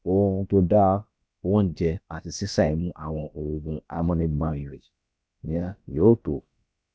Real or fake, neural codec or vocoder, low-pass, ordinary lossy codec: fake; codec, 16 kHz, about 1 kbps, DyCAST, with the encoder's durations; none; none